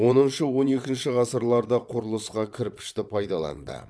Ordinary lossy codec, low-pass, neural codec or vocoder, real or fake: none; none; vocoder, 22.05 kHz, 80 mel bands, WaveNeXt; fake